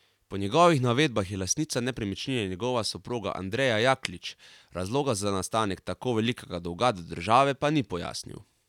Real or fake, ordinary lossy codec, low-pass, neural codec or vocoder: real; none; 19.8 kHz; none